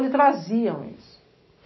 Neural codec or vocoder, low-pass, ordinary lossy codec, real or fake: none; 7.2 kHz; MP3, 24 kbps; real